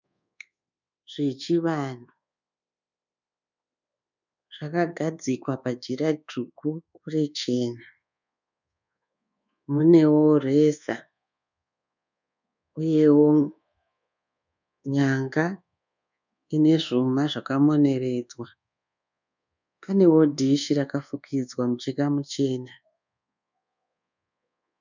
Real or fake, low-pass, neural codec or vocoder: fake; 7.2 kHz; codec, 24 kHz, 1.2 kbps, DualCodec